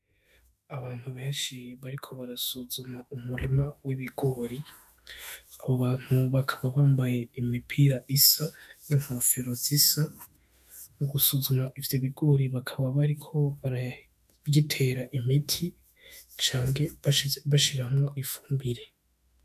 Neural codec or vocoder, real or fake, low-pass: autoencoder, 48 kHz, 32 numbers a frame, DAC-VAE, trained on Japanese speech; fake; 14.4 kHz